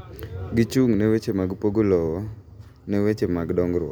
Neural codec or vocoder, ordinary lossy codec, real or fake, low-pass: none; none; real; none